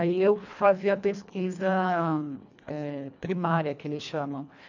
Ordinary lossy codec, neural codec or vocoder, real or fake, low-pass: none; codec, 24 kHz, 1.5 kbps, HILCodec; fake; 7.2 kHz